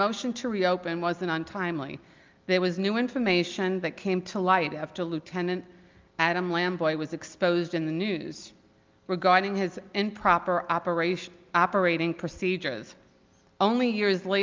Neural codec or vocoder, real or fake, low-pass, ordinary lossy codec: none; real; 7.2 kHz; Opus, 24 kbps